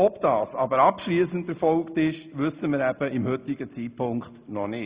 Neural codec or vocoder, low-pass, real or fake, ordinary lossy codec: none; 3.6 kHz; real; Opus, 64 kbps